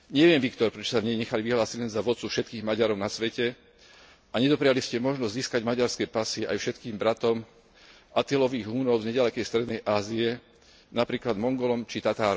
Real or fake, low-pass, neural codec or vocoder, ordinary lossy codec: real; none; none; none